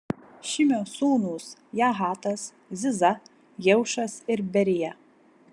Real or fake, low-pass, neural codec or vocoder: real; 10.8 kHz; none